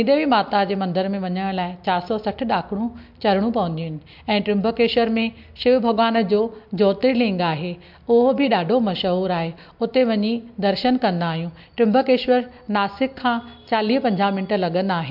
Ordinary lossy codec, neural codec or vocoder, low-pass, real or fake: MP3, 48 kbps; none; 5.4 kHz; real